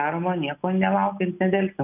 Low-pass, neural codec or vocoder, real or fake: 3.6 kHz; none; real